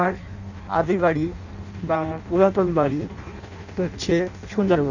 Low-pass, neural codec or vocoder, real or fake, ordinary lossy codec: 7.2 kHz; codec, 16 kHz in and 24 kHz out, 0.6 kbps, FireRedTTS-2 codec; fake; none